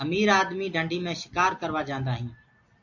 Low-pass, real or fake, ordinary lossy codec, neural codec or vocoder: 7.2 kHz; real; AAC, 48 kbps; none